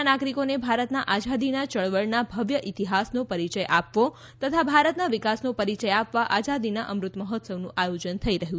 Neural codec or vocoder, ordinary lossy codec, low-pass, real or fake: none; none; none; real